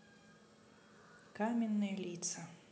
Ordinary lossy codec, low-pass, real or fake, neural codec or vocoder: none; none; real; none